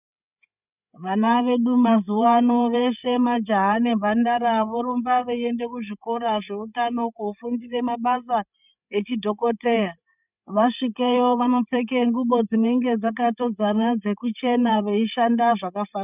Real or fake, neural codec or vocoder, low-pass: fake; codec, 16 kHz, 8 kbps, FreqCodec, larger model; 3.6 kHz